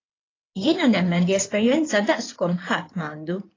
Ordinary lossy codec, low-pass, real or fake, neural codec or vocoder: AAC, 32 kbps; 7.2 kHz; fake; codec, 16 kHz in and 24 kHz out, 2.2 kbps, FireRedTTS-2 codec